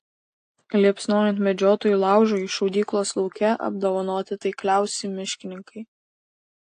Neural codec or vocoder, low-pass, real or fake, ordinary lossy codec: none; 9.9 kHz; real; AAC, 48 kbps